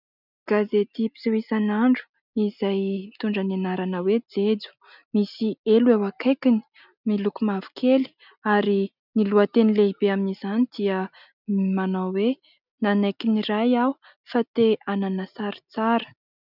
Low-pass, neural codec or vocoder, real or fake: 5.4 kHz; none; real